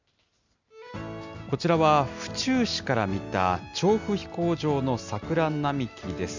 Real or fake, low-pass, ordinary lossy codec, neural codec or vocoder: real; 7.2 kHz; Opus, 64 kbps; none